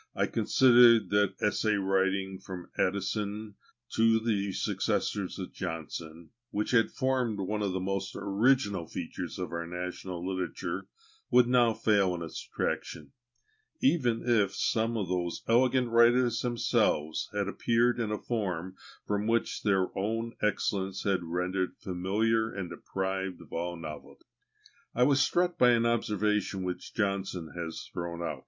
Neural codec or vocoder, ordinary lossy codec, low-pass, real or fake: none; MP3, 48 kbps; 7.2 kHz; real